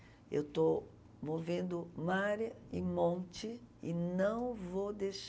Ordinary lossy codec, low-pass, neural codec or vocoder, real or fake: none; none; none; real